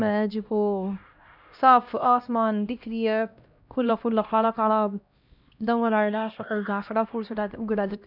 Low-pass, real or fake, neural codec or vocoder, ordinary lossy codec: 5.4 kHz; fake; codec, 16 kHz, 1 kbps, X-Codec, HuBERT features, trained on LibriSpeech; none